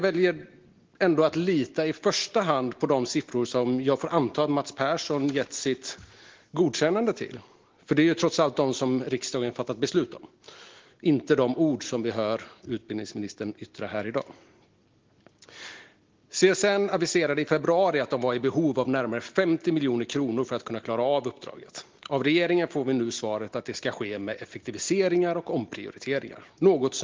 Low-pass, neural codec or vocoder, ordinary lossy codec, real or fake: 7.2 kHz; none; Opus, 16 kbps; real